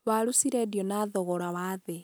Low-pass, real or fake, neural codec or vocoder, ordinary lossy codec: none; real; none; none